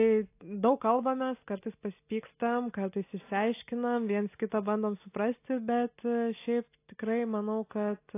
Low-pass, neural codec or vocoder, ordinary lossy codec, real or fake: 3.6 kHz; none; AAC, 24 kbps; real